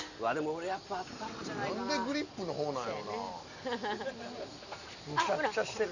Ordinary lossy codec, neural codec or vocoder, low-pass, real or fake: none; none; 7.2 kHz; real